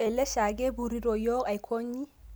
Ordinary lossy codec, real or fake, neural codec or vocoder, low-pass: none; real; none; none